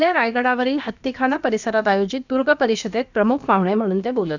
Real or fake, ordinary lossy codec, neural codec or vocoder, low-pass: fake; none; codec, 16 kHz, about 1 kbps, DyCAST, with the encoder's durations; 7.2 kHz